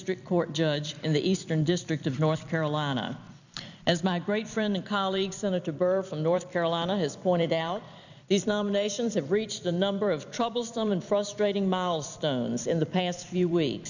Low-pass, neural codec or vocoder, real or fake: 7.2 kHz; vocoder, 44.1 kHz, 128 mel bands every 256 samples, BigVGAN v2; fake